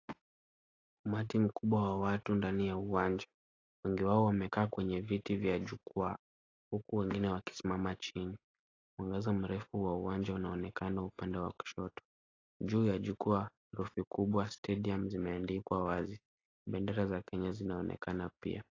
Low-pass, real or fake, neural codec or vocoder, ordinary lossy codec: 7.2 kHz; real; none; AAC, 32 kbps